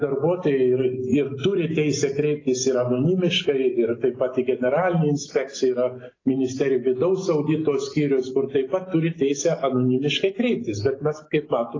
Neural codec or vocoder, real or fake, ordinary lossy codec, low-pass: none; real; AAC, 32 kbps; 7.2 kHz